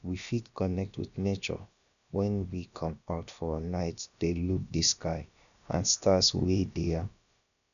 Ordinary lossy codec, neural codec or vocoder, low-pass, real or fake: none; codec, 16 kHz, about 1 kbps, DyCAST, with the encoder's durations; 7.2 kHz; fake